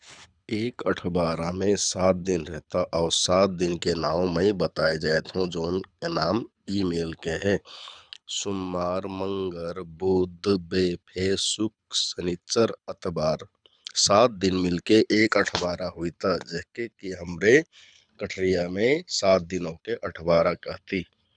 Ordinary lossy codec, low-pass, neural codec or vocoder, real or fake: none; 9.9 kHz; codec, 24 kHz, 6 kbps, HILCodec; fake